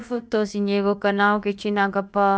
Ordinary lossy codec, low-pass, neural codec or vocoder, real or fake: none; none; codec, 16 kHz, about 1 kbps, DyCAST, with the encoder's durations; fake